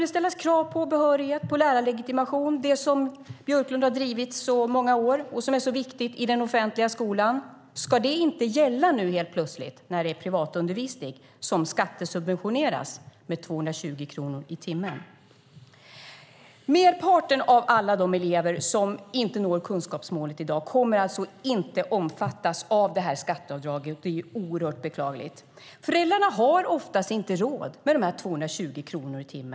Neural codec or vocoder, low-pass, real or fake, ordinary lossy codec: none; none; real; none